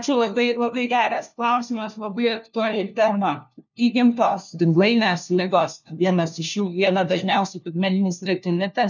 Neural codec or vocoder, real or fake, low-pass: codec, 16 kHz, 1 kbps, FunCodec, trained on LibriTTS, 50 frames a second; fake; 7.2 kHz